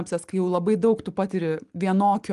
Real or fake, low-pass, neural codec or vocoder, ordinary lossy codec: real; 10.8 kHz; none; Opus, 24 kbps